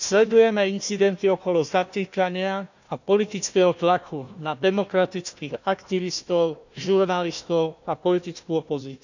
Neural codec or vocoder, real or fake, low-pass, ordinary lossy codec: codec, 16 kHz, 1 kbps, FunCodec, trained on Chinese and English, 50 frames a second; fake; 7.2 kHz; none